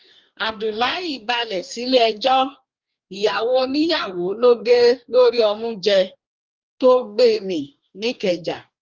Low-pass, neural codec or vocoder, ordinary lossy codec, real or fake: 7.2 kHz; codec, 44.1 kHz, 2.6 kbps, DAC; Opus, 24 kbps; fake